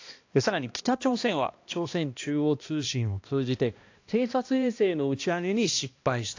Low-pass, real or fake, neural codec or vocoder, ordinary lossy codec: 7.2 kHz; fake; codec, 16 kHz, 1 kbps, X-Codec, HuBERT features, trained on balanced general audio; AAC, 48 kbps